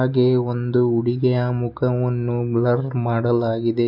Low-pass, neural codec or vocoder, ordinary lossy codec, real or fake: 5.4 kHz; none; none; real